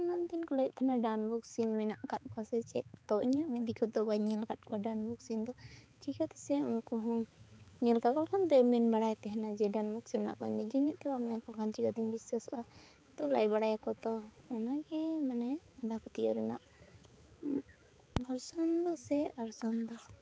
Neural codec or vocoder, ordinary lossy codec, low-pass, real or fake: codec, 16 kHz, 4 kbps, X-Codec, HuBERT features, trained on balanced general audio; none; none; fake